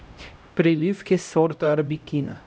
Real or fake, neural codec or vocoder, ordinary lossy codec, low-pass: fake; codec, 16 kHz, 0.5 kbps, X-Codec, HuBERT features, trained on LibriSpeech; none; none